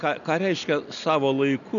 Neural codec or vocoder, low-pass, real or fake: none; 7.2 kHz; real